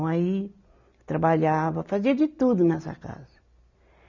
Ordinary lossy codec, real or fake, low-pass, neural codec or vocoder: none; real; 7.2 kHz; none